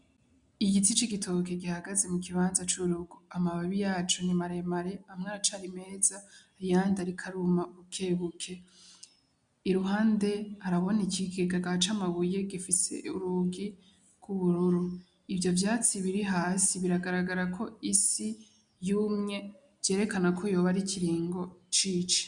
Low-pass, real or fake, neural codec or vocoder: 9.9 kHz; real; none